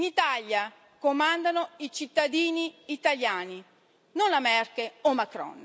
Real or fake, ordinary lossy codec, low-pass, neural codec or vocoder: real; none; none; none